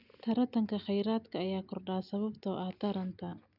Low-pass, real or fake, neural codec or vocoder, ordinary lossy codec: 5.4 kHz; real; none; none